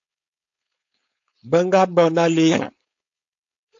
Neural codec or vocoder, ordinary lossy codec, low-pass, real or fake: codec, 16 kHz, 4.8 kbps, FACodec; AAC, 64 kbps; 7.2 kHz; fake